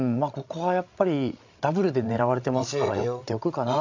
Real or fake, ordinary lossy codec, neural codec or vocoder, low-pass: fake; AAC, 48 kbps; codec, 16 kHz, 16 kbps, FreqCodec, larger model; 7.2 kHz